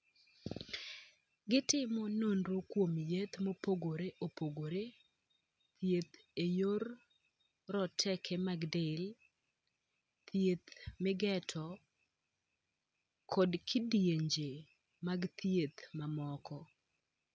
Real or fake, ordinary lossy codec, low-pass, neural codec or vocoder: real; none; none; none